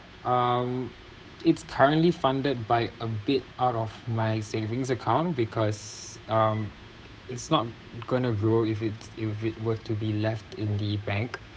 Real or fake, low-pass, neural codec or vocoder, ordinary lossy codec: fake; none; codec, 16 kHz, 8 kbps, FunCodec, trained on Chinese and English, 25 frames a second; none